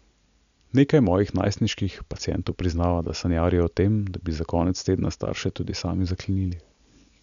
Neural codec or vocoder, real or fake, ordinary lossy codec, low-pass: none; real; none; 7.2 kHz